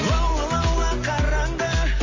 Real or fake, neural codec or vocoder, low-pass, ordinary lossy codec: real; none; 7.2 kHz; MP3, 32 kbps